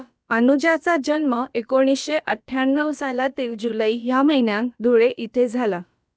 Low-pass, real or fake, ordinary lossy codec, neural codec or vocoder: none; fake; none; codec, 16 kHz, about 1 kbps, DyCAST, with the encoder's durations